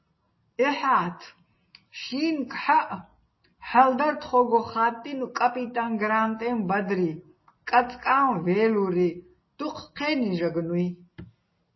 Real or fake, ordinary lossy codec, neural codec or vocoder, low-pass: real; MP3, 24 kbps; none; 7.2 kHz